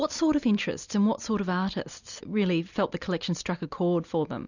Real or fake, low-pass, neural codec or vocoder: real; 7.2 kHz; none